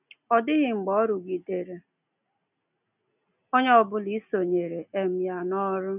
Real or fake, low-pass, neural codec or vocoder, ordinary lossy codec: real; 3.6 kHz; none; none